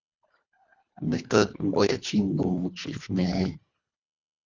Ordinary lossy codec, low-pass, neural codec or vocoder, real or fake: Opus, 64 kbps; 7.2 kHz; codec, 24 kHz, 1.5 kbps, HILCodec; fake